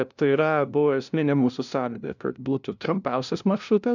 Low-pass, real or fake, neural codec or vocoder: 7.2 kHz; fake; codec, 16 kHz, 0.5 kbps, FunCodec, trained on LibriTTS, 25 frames a second